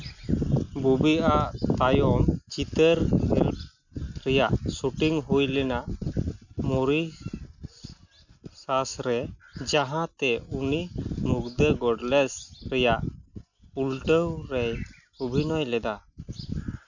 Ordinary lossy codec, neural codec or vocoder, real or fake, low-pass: none; none; real; 7.2 kHz